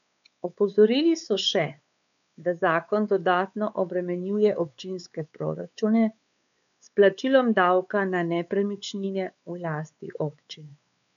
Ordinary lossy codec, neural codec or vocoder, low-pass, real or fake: none; codec, 16 kHz, 4 kbps, X-Codec, WavLM features, trained on Multilingual LibriSpeech; 7.2 kHz; fake